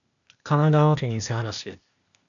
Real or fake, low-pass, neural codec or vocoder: fake; 7.2 kHz; codec, 16 kHz, 0.8 kbps, ZipCodec